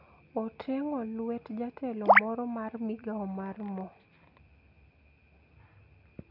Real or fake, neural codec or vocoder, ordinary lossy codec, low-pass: real; none; none; 5.4 kHz